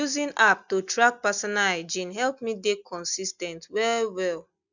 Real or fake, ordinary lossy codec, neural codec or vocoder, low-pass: real; none; none; 7.2 kHz